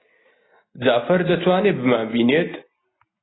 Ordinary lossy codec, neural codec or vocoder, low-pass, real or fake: AAC, 16 kbps; none; 7.2 kHz; real